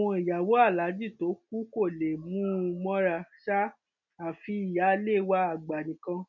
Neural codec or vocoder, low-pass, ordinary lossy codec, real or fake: none; 7.2 kHz; none; real